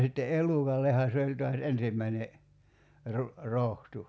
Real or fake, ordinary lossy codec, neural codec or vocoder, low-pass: real; none; none; none